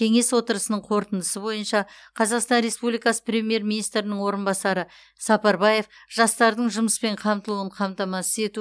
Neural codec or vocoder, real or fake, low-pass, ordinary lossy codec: none; real; none; none